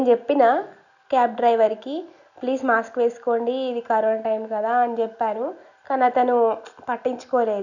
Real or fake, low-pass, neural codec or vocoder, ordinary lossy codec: real; 7.2 kHz; none; none